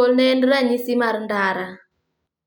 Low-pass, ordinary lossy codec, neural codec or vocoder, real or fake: 19.8 kHz; none; none; real